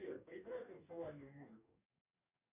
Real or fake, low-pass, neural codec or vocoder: fake; 3.6 kHz; codec, 44.1 kHz, 2.6 kbps, DAC